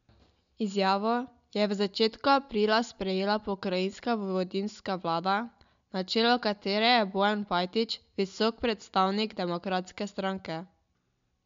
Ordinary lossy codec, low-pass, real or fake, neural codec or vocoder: MP3, 64 kbps; 7.2 kHz; real; none